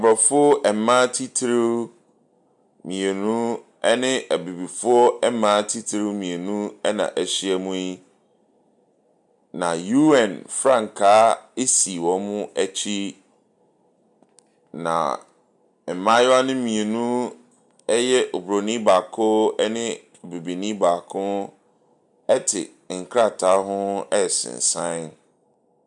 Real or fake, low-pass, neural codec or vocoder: real; 10.8 kHz; none